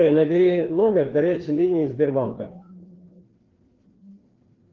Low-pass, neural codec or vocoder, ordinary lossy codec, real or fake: 7.2 kHz; codec, 16 kHz, 2 kbps, FreqCodec, larger model; Opus, 16 kbps; fake